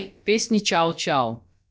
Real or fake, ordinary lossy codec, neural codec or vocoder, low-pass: fake; none; codec, 16 kHz, about 1 kbps, DyCAST, with the encoder's durations; none